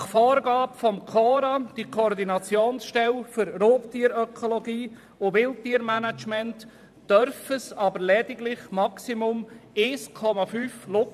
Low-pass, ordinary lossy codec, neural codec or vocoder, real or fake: 14.4 kHz; none; vocoder, 44.1 kHz, 128 mel bands every 512 samples, BigVGAN v2; fake